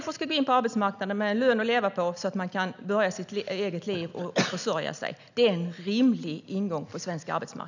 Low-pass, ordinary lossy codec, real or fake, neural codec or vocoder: 7.2 kHz; none; real; none